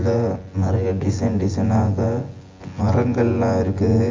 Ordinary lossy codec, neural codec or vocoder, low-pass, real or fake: Opus, 32 kbps; vocoder, 24 kHz, 100 mel bands, Vocos; 7.2 kHz; fake